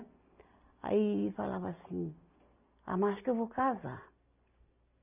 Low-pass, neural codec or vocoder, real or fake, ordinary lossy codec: 3.6 kHz; none; real; none